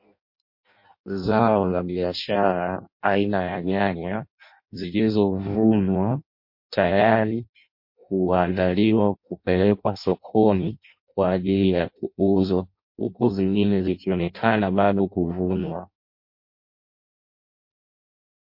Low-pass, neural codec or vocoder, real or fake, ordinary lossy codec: 5.4 kHz; codec, 16 kHz in and 24 kHz out, 0.6 kbps, FireRedTTS-2 codec; fake; MP3, 32 kbps